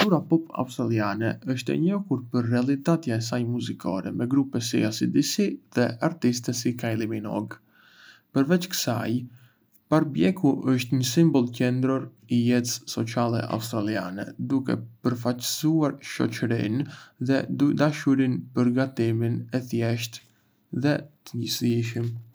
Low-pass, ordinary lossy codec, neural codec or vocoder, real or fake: none; none; none; real